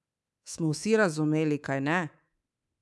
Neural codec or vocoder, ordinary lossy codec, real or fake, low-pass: codec, 24 kHz, 3.1 kbps, DualCodec; none; fake; none